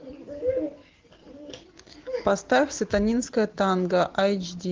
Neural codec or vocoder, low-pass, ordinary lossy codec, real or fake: none; 7.2 kHz; Opus, 16 kbps; real